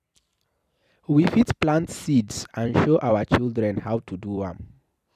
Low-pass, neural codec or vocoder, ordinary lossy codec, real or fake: 14.4 kHz; vocoder, 44.1 kHz, 128 mel bands every 256 samples, BigVGAN v2; none; fake